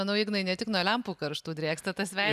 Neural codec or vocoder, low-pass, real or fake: none; 14.4 kHz; real